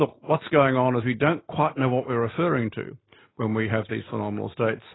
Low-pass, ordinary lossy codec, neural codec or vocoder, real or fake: 7.2 kHz; AAC, 16 kbps; none; real